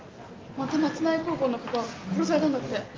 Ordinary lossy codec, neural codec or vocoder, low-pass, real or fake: Opus, 32 kbps; none; 7.2 kHz; real